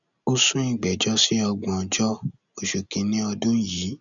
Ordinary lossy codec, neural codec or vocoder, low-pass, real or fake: none; none; 7.2 kHz; real